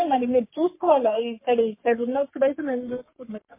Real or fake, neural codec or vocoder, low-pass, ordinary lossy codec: fake; codec, 44.1 kHz, 3.4 kbps, Pupu-Codec; 3.6 kHz; MP3, 24 kbps